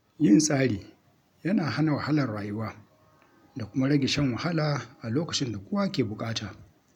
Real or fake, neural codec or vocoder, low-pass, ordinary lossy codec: real; none; 19.8 kHz; none